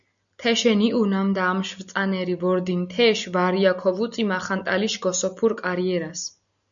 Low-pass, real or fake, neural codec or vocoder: 7.2 kHz; real; none